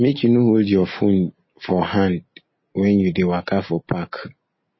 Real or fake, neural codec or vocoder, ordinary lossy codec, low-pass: real; none; MP3, 24 kbps; 7.2 kHz